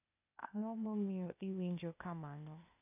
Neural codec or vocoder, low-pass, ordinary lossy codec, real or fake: codec, 16 kHz, 0.8 kbps, ZipCodec; 3.6 kHz; none; fake